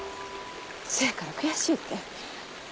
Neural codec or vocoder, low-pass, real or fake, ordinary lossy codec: none; none; real; none